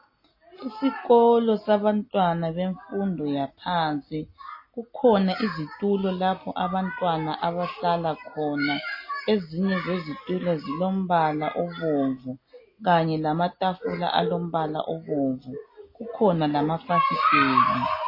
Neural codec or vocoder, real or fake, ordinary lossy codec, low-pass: none; real; MP3, 24 kbps; 5.4 kHz